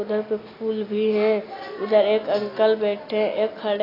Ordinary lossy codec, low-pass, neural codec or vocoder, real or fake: AAC, 24 kbps; 5.4 kHz; none; real